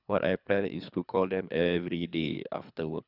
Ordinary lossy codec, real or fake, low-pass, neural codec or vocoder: none; fake; 5.4 kHz; codec, 24 kHz, 3 kbps, HILCodec